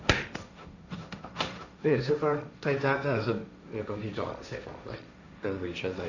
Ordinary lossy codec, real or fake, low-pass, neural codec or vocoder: none; fake; 7.2 kHz; codec, 16 kHz, 1.1 kbps, Voila-Tokenizer